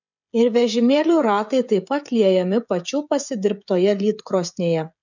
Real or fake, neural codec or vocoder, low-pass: fake; codec, 16 kHz, 16 kbps, FreqCodec, smaller model; 7.2 kHz